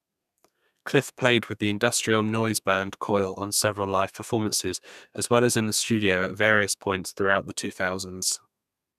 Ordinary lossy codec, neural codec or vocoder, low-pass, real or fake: none; codec, 32 kHz, 1.9 kbps, SNAC; 14.4 kHz; fake